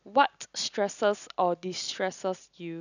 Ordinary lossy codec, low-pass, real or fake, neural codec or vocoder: none; 7.2 kHz; real; none